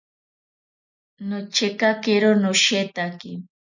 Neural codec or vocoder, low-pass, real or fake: none; 7.2 kHz; real